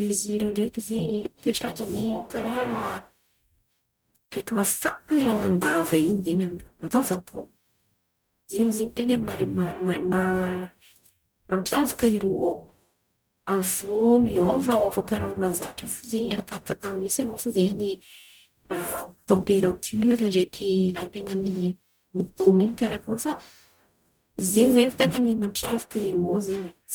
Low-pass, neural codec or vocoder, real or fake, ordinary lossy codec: none; codec, 44.1 kHz, 0.9 kbps, DAC; fake; none